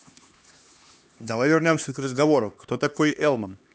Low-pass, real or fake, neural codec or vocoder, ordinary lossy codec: none; fake; codec, 16 kHz, 2 kbps, X-Codec, HuBERT features, trained on LibriSpeech; none